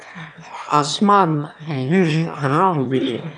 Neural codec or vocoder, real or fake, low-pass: autoencoder, 22.05 kHz, a latent of 192 numbers a frame, VITS, trained on one speaker; fake; 9.9 kHz